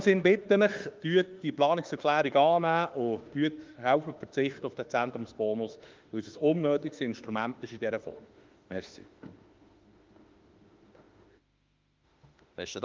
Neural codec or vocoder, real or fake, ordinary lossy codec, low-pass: autoencoder, 48 kHz, 32 numbers a frame, DAC-VAE, trained on Japanese speech; fake; Opus, 32 kbps; 7.2 kHz